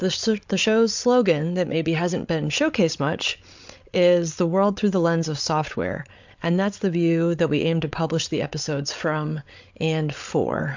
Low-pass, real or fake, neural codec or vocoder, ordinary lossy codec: 7.2 kHz; fake; codec, 16 kHz, 8 kbps, FreqCodec, larger model; MP3, 64 kbps